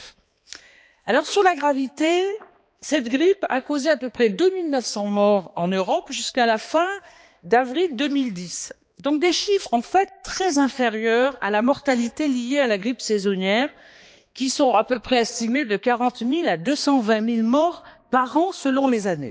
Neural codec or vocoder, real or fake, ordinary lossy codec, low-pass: codec, 16 kHz, 2 kbps, X-Codec, HuBERT features, trained on balanced general audio; fake; none; none